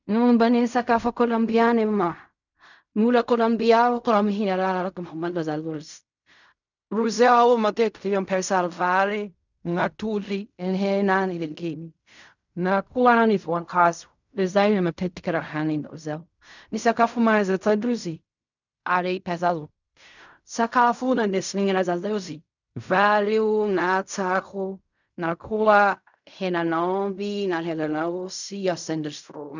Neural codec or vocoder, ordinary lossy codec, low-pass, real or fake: codec, 16 kHz in and 24 kHz out, 0.4 kbps, LongCat-Audio-Codec, fine tuned four codebook decoder; none; 7.2 kHz; fake